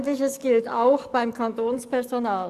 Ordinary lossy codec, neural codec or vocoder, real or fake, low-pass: Opus, 64 kbps; codec, 44.1 kHz, 7.8 kbps, DAC; fake; 14.4 kHz